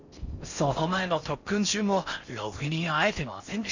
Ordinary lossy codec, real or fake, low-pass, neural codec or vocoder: none; fake; 7.2 kHz; codec, 16 kHz in and 24 kHz out, 0.6 kbps, FocalCodec, streaming, 4096 codes